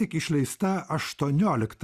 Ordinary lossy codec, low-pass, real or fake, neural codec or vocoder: Opus, 64 kbps; 14.4 kHz; real; none